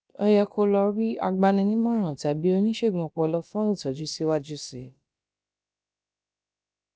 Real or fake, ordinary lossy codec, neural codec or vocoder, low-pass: fake; none; codec, 16 kHz, about 1 kbps, DyCAST, with the encoder's durations; none